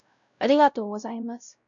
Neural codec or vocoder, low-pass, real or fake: codec, 16 kHz, 0.5 kbps, X-Codec, WavLM features, trained on Multilingual LibriSpeech; 7.2 kHz; fake